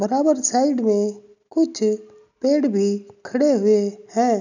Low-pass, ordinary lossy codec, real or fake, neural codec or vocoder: 7.2 kHz; none; real; none